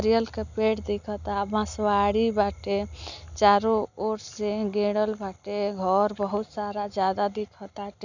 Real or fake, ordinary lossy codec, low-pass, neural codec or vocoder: real; none; 7.2 kHz; none